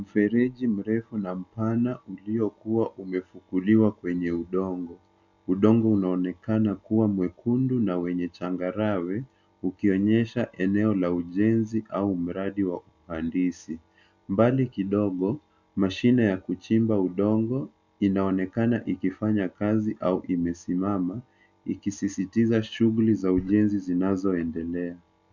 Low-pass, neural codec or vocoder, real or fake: 7.2 kHz; none; real